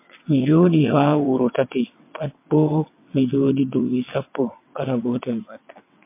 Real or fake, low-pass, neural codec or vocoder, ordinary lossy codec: fake; 3.6 kHz; vocoder, 22.05 kHz, 80 mel bands, WaveNeXt; MP3, 24 kbps